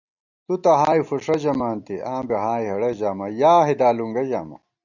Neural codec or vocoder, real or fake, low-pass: none; real; 7.2 kHz